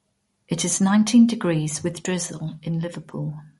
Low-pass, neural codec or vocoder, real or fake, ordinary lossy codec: 19.8 kHz; none; real; MP3, 48 kbps